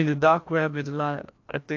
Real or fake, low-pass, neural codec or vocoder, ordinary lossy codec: fake; 7.2 kHz; codec, 16 kHz, 1 kbps, FreqCodec, larger model; AAC, 48 kbps